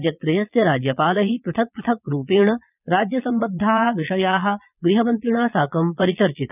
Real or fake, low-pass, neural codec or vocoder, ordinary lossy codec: fake; 3.6 kHz; vocoder, 22.05 kHz, 80 mel bands, Vocos; none